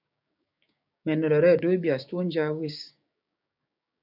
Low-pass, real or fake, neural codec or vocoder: 5.4 kHz; fake; codec, 16 kHz, 6 kbps, DAC